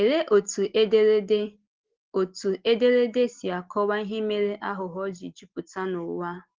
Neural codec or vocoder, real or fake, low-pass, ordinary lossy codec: none; real; 7.2 kHz; Opus, 16 kbps